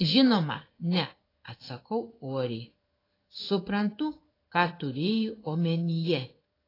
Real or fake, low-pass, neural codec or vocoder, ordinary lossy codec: fake; 5.4 kHz; codec, 16 kHz in and 24 kHz out, 1 kbps, XY-Tokenizer; AAC, 24 kbps